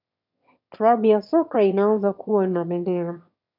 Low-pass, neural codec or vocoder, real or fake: 5.4 kHz; autoencoder, 22.05 kHz, a latent of 192 numbers a frame, VITS, trained on one speaker; fake